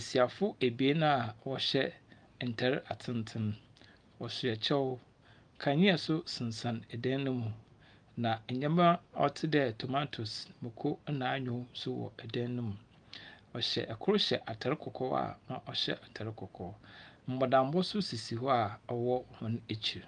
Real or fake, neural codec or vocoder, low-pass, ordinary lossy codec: real; none; 9.9 kHz; Opus, 32 kbps